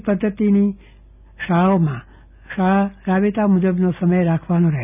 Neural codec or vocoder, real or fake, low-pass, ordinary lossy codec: none; real; 3.6 kHz; none